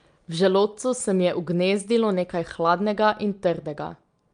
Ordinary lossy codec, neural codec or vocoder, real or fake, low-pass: Opus, 32 kbps; none; real; 9.9 kHz